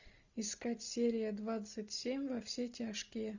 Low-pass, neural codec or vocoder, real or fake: 7.2 kHz; none; real